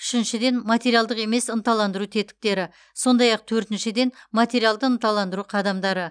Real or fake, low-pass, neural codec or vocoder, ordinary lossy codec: real; 9.9 kHz; none; none